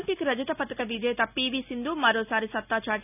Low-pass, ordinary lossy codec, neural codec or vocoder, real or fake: 3.6 kHz; none; none; real